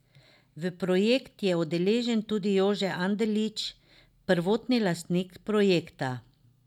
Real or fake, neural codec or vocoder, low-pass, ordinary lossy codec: real; none; 19.8 kHz; none